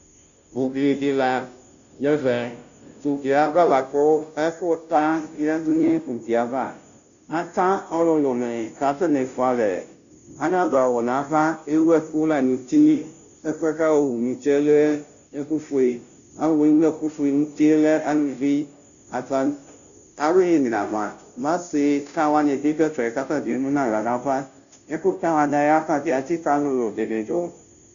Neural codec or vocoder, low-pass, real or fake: codec, 16 kHz, 0.5 kbps, FunCodec, trained on Chinese and English, 25 frames a second; 7.2 kHz; fake